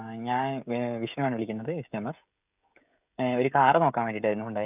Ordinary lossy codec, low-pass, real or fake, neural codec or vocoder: none; 3.6 kHz; fake; codec, 16 kHz, 16 kbps, FreqCodec, smaller model